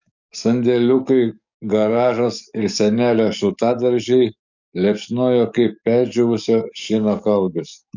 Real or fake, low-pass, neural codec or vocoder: fake; 7.2 kHz; codec, 44.1 kHz, 7.8 kbps, DAC